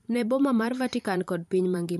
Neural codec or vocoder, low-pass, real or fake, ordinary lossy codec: vocoder, 44.1 kHz, 128 mel bands every 512 samples, BigVGAN v2; 14.4 kHz; fake; MP3, 64 kbps